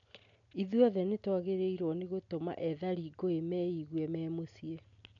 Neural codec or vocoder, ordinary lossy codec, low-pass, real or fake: none; none; 7.2 kHz; real